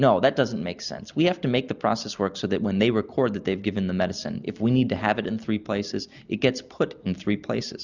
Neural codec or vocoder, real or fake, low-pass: none; real; 7.2 kHz